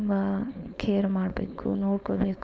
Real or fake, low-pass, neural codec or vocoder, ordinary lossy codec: fake; none; codec, 16 kHz, 4.8 kbps, FACodec; none